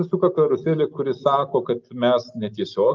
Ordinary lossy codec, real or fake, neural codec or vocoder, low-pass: Opus, 24 kbps; real; none; 7.2 kHz